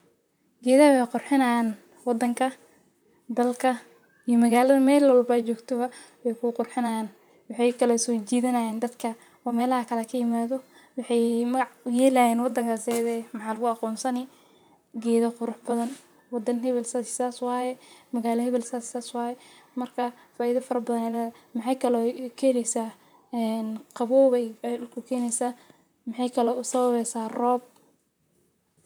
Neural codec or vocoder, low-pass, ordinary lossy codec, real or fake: vocoder, 44.1 kHz, 128 mel bands, Pupu-Vocoder; none; none; fake